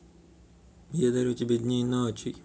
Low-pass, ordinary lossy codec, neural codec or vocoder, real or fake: none; none; none; real